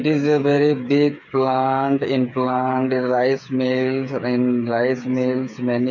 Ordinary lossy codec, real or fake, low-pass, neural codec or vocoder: none; fake; 7.2 kHz; codec, 16 kHz, 8 kbps, FreqCodec, smaller model